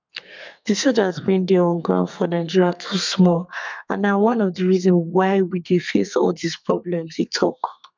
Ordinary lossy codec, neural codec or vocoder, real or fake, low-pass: MP3, 64 kbps; codec, 32 kHz, 1.9 kbps, SNAC; fake; 7.2 kHz